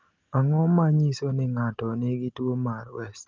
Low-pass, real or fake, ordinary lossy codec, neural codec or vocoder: 7.2 kHz; real; Opus, 32 kbps; none